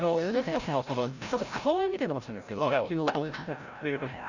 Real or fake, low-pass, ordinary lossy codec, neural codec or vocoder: fake; 7.2 kHz; none; codec, 16 kHz, 0.5 kbps, FreqCodec, larger model